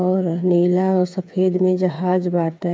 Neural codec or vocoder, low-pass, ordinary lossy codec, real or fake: codec, 16 kHz, 8 kbps, FreqCodec, smaller model; none; none; fake